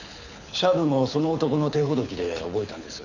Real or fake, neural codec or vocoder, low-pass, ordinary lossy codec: fake; codec, 24 kHz, 6 kbps, HILCodec; 7.2 kHz; none